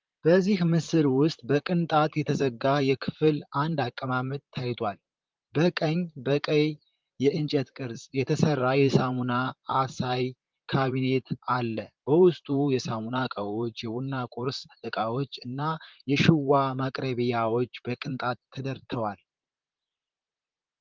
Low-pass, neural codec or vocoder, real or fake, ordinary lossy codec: 7.2 kHz; vocoder, 44.1 kHz, 128 mel bands, Pupu-Vocoder; fake; Opus, 32 kbps